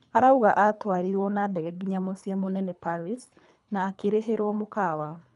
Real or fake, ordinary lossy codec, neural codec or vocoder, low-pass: fake; none; codec, 24 kHz, 3 kbps, HILCodec; 10.8 kHz